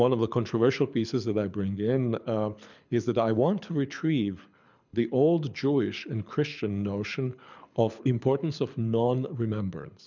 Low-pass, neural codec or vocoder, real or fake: 7.2 kHz; codec, 24 kHz, 6 kbps, HILCodec; fake